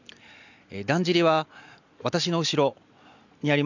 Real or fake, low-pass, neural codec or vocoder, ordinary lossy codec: real; 7.2 kHz; none; none